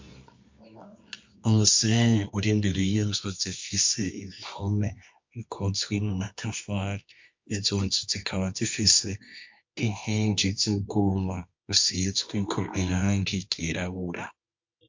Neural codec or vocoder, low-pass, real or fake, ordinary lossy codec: codec, 24 kHz, 0.9 kbps, WavTokenizer, medium music audio release; 7.2 kHz; fake; MP3, 48 kbps